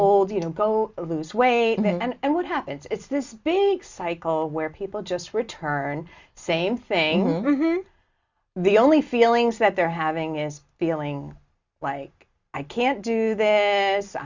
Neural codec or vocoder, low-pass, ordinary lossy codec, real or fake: none; 7.2 kHz; Opus, 64 kbps; real